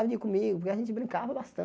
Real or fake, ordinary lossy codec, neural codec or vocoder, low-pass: real; none; none; none